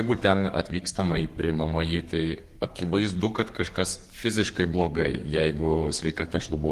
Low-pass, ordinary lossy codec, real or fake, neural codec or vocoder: 14.4 kHz; Opus, 24 kbps; fake; codec, 32 kHz, 1.9 kbps, SNAC